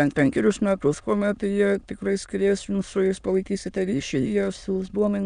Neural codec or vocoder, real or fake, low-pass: autoencoder, 22.05 kHz, a latent of 192 numbers a frame, VITS, trained on many speakers; fake; 9.9 kHz